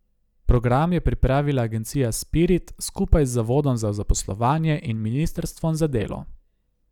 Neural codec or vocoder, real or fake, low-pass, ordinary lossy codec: none; real; 19.8 kHz; none